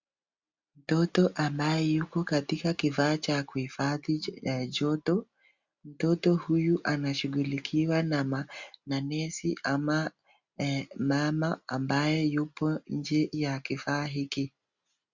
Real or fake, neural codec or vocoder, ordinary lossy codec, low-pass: real; none; Opus, 64 kbps; 7.2 kHz